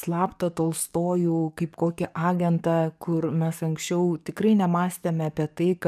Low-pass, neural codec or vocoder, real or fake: 14.4 kHz; codec, 44.1 kHz, 7.8 kbps, DAC; fake